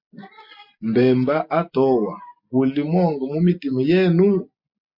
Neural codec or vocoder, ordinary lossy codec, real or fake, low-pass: none; MP3, 48 kbps; real; 5.4 kHz